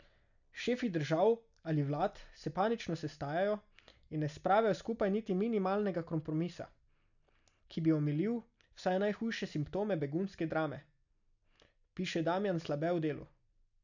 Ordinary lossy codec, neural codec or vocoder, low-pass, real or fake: none; none; 7.2 kHz; real